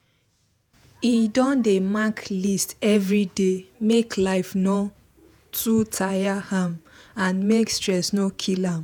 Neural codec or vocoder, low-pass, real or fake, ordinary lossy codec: vocoder, 48 kHz, 128 mel bands, Vocos; none; fake; none